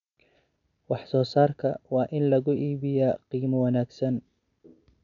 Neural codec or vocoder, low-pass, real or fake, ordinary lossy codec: none; 7.2 kHz; real; none